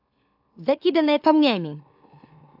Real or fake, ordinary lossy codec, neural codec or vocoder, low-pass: fake; AAC, 48 kbps; autoencoder, 44.1 kHz, a latent of 192 numbers a frame, MeloTTS; 5.4 kHz